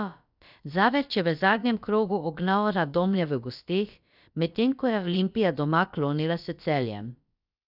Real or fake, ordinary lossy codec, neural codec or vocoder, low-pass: fake; none; codec, 16 kHz, about 1 kbps, DyCAST, with the encoder's durations; 5.4 kHz